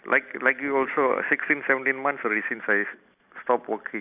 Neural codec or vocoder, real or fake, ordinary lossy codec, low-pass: none; real; none; 3.6 kHz